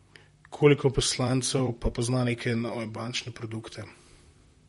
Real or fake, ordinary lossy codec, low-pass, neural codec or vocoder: fake; MP3, 48 kbps; 19.8 kHz; vocoder, 44.1 kHz, 128 mel bands, Pupu-Vocoder